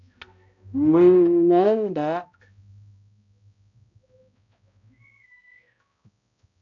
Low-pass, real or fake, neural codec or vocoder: 7.2 kHz; fake; codec, 16 kHz, 0.5 kbps, X-Codec, HuBERT features, trained on balanced general audio